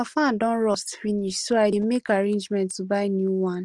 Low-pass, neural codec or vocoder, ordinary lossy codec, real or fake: 10.8 kHz; none; Opus, 24 kbps; real